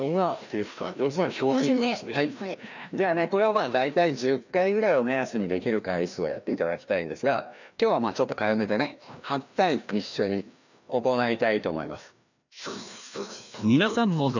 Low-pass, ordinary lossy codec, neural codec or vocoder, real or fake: 7.2 kHz; none; codec, 16 kHz, 1 kbps, FreqCodec, larger model; fake